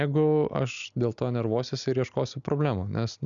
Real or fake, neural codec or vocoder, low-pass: real; none; 7.2 kHz